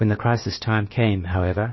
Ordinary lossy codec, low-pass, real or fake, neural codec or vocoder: MP3, 24 kbps; 7.2 kHz; fake; codec, 16 kHz, about 1 kbps, DyCAST, with the encoder's durations